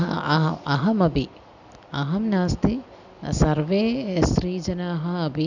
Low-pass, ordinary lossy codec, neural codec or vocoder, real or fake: 7.2 kHz; none; none; real